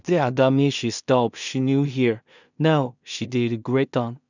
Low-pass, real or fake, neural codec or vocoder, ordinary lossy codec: 7.2 kHz; fake; codec, 16 kHz in and 24 kHz out, 0.4 kbps, LongCat-Audio-Codec, two codebook decoder; none